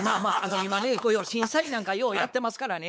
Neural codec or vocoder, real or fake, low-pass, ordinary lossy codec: codec, 16 kHz, 4 kbps, X-Codec, WavLM features, trained on Multilingual LibriSpeech; fake; none; none